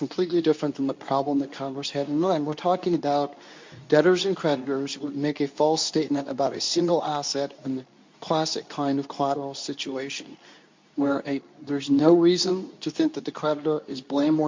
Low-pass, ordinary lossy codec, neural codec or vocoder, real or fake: 7.2 kHz; MP3, 48 kbps; codec, 24 kHz, 0.9 kbps, WavTokenizer, medium speech release version 2; fake